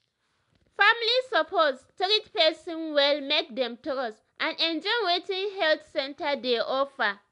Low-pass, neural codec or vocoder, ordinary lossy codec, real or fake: 10.8 kHz; none; MP3, 96 kbps; real